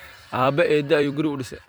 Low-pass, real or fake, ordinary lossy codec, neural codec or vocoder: none; fake; none; vocoder, 44.1 kHz, 128 mel bands every 256 samples, BigVGAN v2